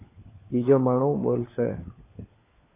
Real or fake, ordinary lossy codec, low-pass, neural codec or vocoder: fake; AAC, 16 kbps; 3.6 kHz; codec, 16 kHz, 4 kbps, FunCodec, trained on LibriTTS, 50 frames a second